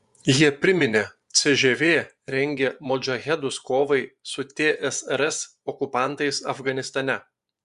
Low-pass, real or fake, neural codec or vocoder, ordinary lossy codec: 10.8 kHz; fake; vocoder, 24 kHz, 100 mel bands, Vocos; Opus, 64 kbps